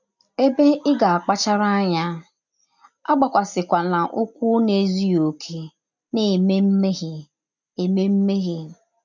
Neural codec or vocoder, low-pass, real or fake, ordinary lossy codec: none; 7.2 kHz; real; none